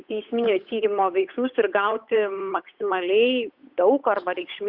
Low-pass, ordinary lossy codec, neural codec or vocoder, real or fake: 5.4 kHz; Opus, 64 kbps; codec, 16 kHz, 8 kbps, FunCodec, trained on Chinese and English, 25 frames a second; fake